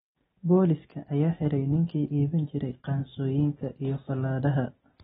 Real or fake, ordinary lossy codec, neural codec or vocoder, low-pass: real; AAC, 16 kbps; none; 9.9 kHz